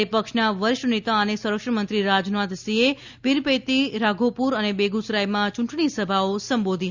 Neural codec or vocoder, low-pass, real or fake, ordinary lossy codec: none; 7.2 kHz; real; Opus, 64 kbps